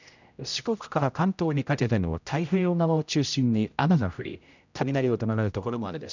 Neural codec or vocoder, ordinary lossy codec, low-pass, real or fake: codec, 16 kHz, 0.5 kbps, X-Codec, HuBERT features, trained on general audio; none; 7.2 kHz; fake